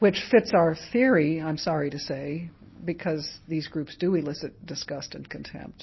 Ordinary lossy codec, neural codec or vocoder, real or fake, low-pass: MP3, 24 kbps; none; real; 7.2 kHz